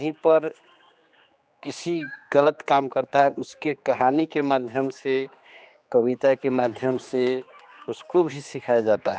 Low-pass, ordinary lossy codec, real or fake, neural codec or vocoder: none; none; fake; codec, 16 kHz, 2 kbps, X-Codec, HuBERT features, trained on general audio